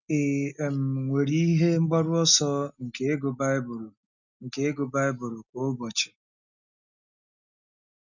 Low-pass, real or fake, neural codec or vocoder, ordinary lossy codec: 7.2 kHz; real; none; none